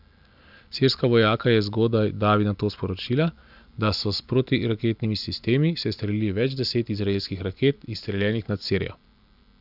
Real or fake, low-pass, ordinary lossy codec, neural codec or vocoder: real; 5.4 kHz; none; none